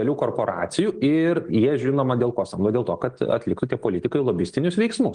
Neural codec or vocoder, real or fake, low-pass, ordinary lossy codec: none; real; 9.9 kHz; Opus, 24 kbps